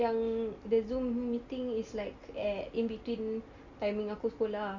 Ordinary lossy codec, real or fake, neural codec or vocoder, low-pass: Opus, 64 kbps; real; none; 7.2 kHz